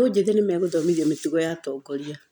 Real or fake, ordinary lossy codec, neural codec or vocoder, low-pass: real; none; none; 19.8 kHz